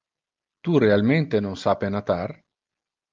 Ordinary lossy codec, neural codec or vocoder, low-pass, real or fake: Opus, 32 kbps; none; 7.2 kHz; real